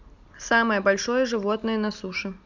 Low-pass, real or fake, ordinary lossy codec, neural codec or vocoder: 7.2 kHz; real; none; none